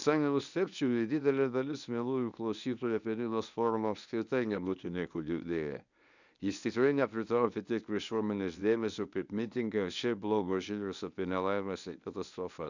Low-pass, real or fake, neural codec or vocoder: 7.2 kHz; fake; codec, 24 kHz, 0.9 kbps, WavTokenizer, medium speech release version 1